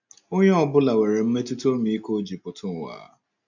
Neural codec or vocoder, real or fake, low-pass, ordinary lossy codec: none; real; 7.2 kHz; none